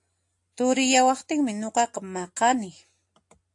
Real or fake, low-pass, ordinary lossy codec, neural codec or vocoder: real; 10.8 kHz; AAC, 64 kbps; none